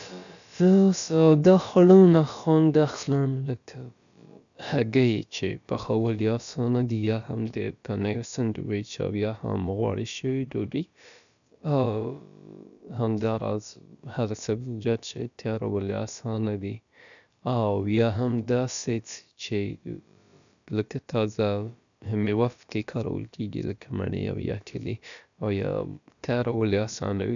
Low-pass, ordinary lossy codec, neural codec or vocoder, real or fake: 7.2 kHz; none; codec, 16 kHz, about 1 kbps, DyCAST, with the encoder's durations; fake